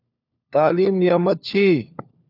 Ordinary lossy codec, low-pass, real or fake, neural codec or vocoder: AAC, 48 kbps; 5.4 kHz; fake; codec, 16 kHz, 4 kbps, FunCodec, trained on LibriTTS, 50 frames a second